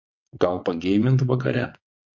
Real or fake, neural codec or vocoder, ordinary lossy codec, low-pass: fake; vocoder, 22.05 kHz, 80 mel bands, WaveNeXt; MP3, 48 kbps; 7.2 kHz